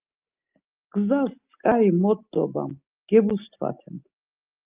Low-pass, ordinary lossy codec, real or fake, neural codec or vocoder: 3.6 kHz; Opus, 24 kbps; real; none